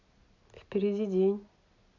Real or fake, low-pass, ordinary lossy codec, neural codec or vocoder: real; 7.2 kHz; none; none